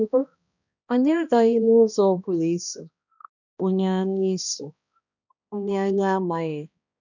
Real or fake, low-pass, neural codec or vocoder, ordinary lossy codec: fake; 7.2 kHz; codec, 16 kHz, 1 kbps, X-Codec, HuBERT features, trained on balanced general audio; none